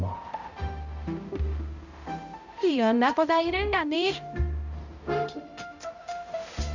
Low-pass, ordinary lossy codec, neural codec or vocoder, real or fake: 7.2 kHz; none; codec, 16 kHz, 0.5 kbps, X-Codec, HuBERT features, trained on balanced general audio; fake